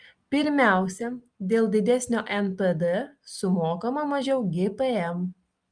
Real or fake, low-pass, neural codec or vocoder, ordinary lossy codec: real; 9.9 kHz; none; Opus, 32 kbps